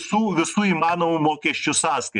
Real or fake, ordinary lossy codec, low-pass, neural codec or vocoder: real; MP3, 96 kbps; 10.8 kHz; none